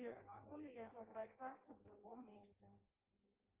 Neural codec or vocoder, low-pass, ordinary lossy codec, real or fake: codec, 16 kHz in and 24 kHz out, 0.6 kbps, FireRedTTS-2 codec; 3.6 kHz; Opus, 32 kbps; fake